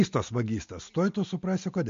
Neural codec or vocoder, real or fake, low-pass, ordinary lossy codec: none; real; 7.2 kHz; MP3, 48 kbps